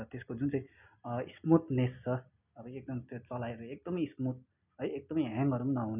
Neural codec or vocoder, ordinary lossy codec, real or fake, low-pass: none; none; real; 3.6 kHz